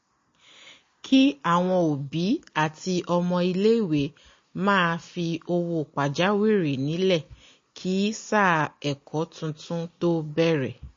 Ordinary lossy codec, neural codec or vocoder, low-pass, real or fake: MP3, 32 kbps; none; 7.2 kHz; real